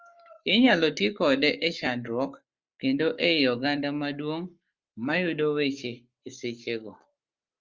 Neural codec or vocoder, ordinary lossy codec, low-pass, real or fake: codec, 44.1 kHz, 7.8 kbps, Pupu-Codec; Opus, 32 kbps; 7.2 kHz; fake